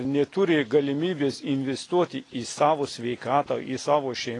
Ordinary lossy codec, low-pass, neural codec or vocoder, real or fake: AAC, 32 kbps; 10.8 kHz; none; real